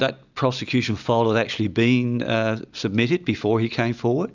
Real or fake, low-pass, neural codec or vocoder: real; 7.2 kHz; none